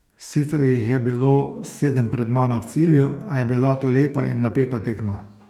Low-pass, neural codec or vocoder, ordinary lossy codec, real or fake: 19.8 kHz; codec, 44.1 kHz, 2.6 kbps, DAC; none; fake